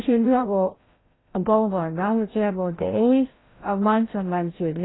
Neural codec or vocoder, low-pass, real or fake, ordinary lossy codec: codec, 16 kHz, 0.5 kbps, FreqCodec, larger model; 7.2 kHz; fake; AAC, 16 kbps